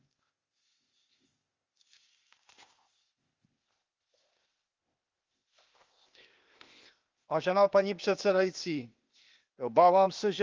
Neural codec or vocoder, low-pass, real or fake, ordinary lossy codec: codec, 16 kHz, 0.8 kbps, ZipCodec; 7.2 kHz; fake; Opus, 32 kbps